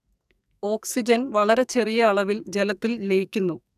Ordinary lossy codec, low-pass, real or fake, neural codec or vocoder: none; 14.4 kHz; fake; codec, 44.1 kHz, 2.6 kbps, SNAC